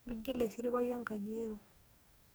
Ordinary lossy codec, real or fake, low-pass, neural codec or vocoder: none; fake; none; codec, 44.1 kHz, 2.6 kbps, DAC